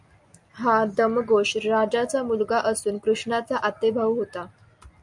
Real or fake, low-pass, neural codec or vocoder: real; 10.8 kHz; none